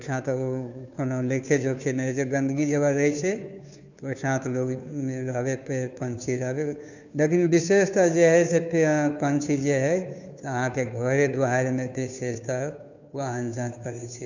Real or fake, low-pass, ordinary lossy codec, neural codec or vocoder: fake; 7.2 kHz; none; codec, 16 kHz, 2 kbps, FunCodec, trained on Chinese and English, 25 frames a second